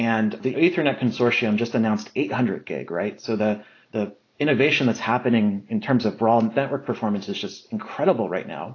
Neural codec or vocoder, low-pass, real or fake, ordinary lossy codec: none; 7.2 kHz; real; AAC, 32 kbps